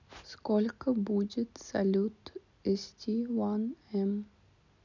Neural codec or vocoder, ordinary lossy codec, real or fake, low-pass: none; none; real; 7.2 kHz